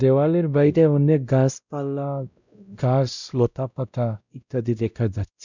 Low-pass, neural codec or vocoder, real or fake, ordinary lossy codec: 7.2 kHz; codec, 16 kHz, 0.5 kbps, X-Codec, WavLM features, trained on Multilingual LibriSpeech; fake; none